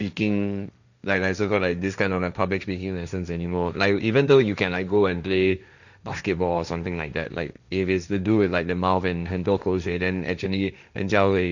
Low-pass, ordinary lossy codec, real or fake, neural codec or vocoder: 7.2 kHz; none; fake; codec, 16 kHz, 1.1 kbps, Voila-Tokenizer